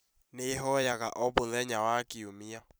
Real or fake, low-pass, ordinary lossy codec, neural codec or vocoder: fake; none; none; vocoder, 44.1 kHz, 128 mel bands every 256 samples, BigVGAN v2